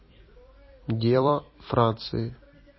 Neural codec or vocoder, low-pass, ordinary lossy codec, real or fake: vocoder, 44.1 kHz, 128 mel bands every 256 samples, BigVGAN v2; 7.2 kHz; MP3, 24 kbps; fake